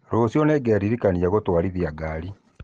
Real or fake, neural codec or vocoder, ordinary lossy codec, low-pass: real; none; Opus, 16 kbps; 9.9 kHz